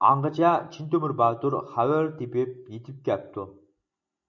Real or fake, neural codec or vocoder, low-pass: real; none; 7.2 kHz